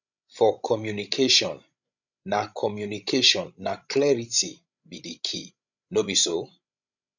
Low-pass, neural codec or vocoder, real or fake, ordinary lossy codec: 7.2 kHz; codec, 16 kHz, 16 kbps, FreqCodec, larger model; fake; none